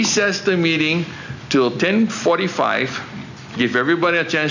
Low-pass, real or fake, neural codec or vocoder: 7.2 kHz; real; none